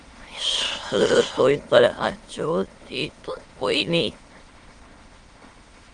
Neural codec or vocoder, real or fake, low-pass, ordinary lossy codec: autoencoder, 22.05 kHz, a latent of 192 numbers a frame, VITS, trained on many speakers; fake; 9.9 kHz; Opus, 24 kbps